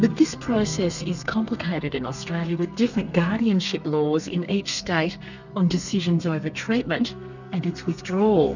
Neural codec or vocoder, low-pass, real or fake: codec, 32 kHz, 1.9 kbps, SNAC; 7.2 kHz; fake